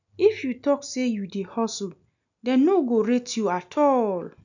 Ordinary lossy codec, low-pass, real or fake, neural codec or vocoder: none; 7.2 kHz; real; none